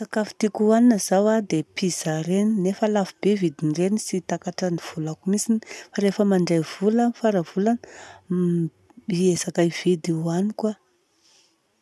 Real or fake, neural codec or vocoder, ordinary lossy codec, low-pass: real; none; none; none